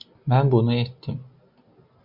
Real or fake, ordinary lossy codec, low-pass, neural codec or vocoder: real; MP3, 48 kbps; 5.4 kHz; none